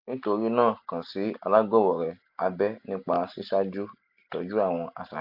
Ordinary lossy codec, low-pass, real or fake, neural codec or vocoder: AAC, 48 kbps; 5.4 kHz; real; none